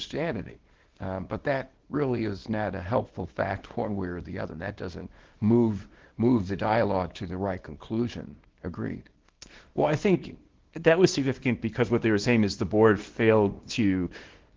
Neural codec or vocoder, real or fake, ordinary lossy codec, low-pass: codec, 24 kHz, 0.9 kbps, WavTokenizer, small release; fake; Opus, 16 kbps; 7.2 kHz